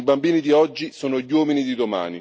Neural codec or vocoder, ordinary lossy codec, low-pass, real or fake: none; none; none; real